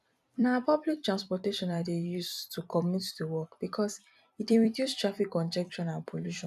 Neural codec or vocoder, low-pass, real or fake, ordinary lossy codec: vocoder, 44.1 kHz, 128 mel bands every 256 samples, BigVGAN v2; 14.4 kHz; fake; none